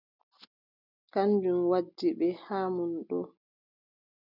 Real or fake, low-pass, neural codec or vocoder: real; 5.4 kHz; none